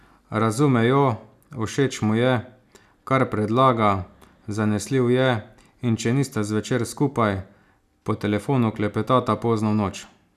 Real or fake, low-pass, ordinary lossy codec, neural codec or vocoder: real; 14.4 kHz; none; none